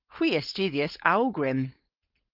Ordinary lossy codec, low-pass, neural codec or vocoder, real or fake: Opus, 24 kbps; 5.4 kHz; codec, 16 kHz, 4.8 kbps, FACodec; fake